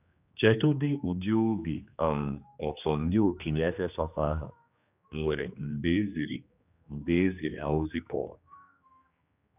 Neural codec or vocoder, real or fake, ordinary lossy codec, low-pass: codec, 16 kHz, 2 kbps, X-Codec, HuBERT features, trained on general audio; fake; none; 3.6 kHz